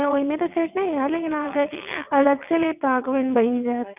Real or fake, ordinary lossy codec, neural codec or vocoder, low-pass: fake; none; vocoder, 22.05 kHz, 80 mel bands, WaveNeXt; 3.6 kHz